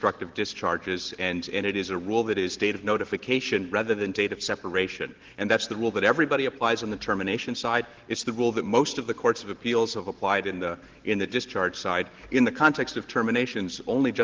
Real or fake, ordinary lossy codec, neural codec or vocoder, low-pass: real; Opus, 16 kbps; none; 7.2 kHz